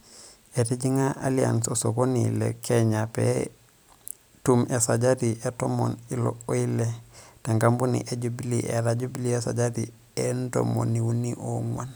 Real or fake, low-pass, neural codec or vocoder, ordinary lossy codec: fake; none; vocoder, 44.1 kHz, 128 mel bands every 256 samples, BigVGAN v2; none